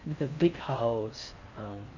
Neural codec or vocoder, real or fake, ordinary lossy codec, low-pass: codec, 16 kHz in and 24 kHz out, 0.6 kbps, FocalCodec, streaming, 4096 codes; fake; none; 7.2 kHz